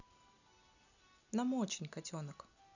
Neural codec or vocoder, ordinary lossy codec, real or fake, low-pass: none; none; real; 7.2 kHz